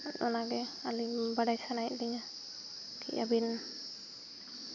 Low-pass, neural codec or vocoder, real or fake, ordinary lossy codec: 7.2 kHz; none; real; none